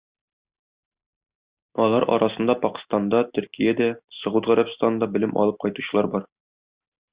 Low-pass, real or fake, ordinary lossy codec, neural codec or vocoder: 3.6 kHz; real; Opus, 64 kbps; none